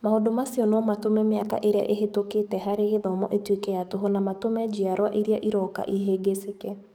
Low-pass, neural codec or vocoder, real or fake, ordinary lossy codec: none; codec, 44.1 kHz, 7.8 kbps, DAC; fake; none